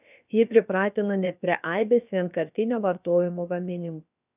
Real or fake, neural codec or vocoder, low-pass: fake; codec, 16 kHz, about 1 kbps, DyCAST, with the encoder's durations; 3.6 kHz